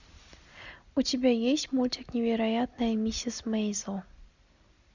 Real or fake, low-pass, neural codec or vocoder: real; 7.2 kHz; none